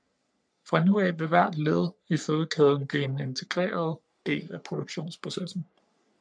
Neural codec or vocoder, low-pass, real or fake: codec, 44.1 kHz, 3.4 kbps, Pupu-Codec; 9.9 kHz; fake